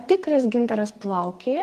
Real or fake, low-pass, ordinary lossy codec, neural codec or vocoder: fake; 14.4 kHz; Opus, 24 kbps; codec, 44.1 kHz, 2.6 kbps, SNAC